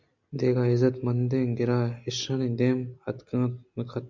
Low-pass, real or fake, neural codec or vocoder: 7.2 kHz; real; none